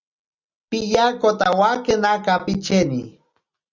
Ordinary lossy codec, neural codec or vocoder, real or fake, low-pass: Opus, 64 kbps; none; real; 7.2 kHz